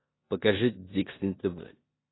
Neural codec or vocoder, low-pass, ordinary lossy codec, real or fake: codec, 16 kHz in and 24 kHz out, 0.9 kbps, LongCat-Audio-Codec, four codebook decoder; 7.2 kHz; AAC, 16 kbps; fake